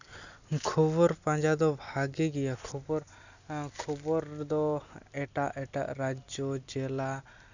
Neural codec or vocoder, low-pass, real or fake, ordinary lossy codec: none; 7.2 kHz; real; none